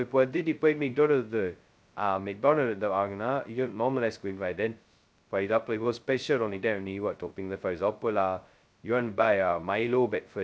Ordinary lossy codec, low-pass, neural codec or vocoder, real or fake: none; none; codec, 16 kHz, 0.2 kbps, FocalCodec; fake